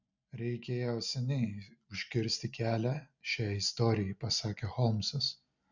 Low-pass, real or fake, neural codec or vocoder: 7.2 kHz; real; none